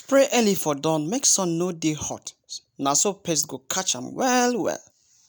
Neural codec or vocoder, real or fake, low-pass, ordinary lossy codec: none; real; none; none